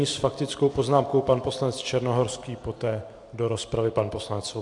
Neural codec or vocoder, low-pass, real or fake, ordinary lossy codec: none; 10.8 kHz; real; AAC, 64 kbps